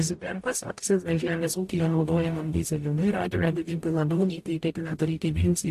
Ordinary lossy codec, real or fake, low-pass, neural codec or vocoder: AAC, 64 kbps; fake; 14.4 kHz; codec, 44.1 kHz, 0.9 kbps, DAC